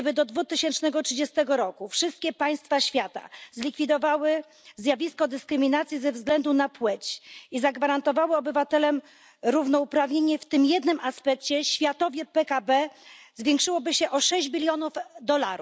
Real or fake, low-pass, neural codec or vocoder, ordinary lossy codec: real; none; none; none